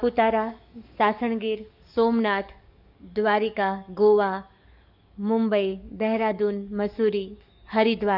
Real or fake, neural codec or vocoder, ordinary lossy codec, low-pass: fake; codec, 44.1 kHz, 7.8 kbps, DAC; none; 5.4 kHz